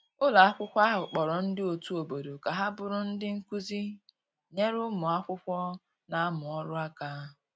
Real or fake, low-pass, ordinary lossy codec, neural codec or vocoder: real; none; none; none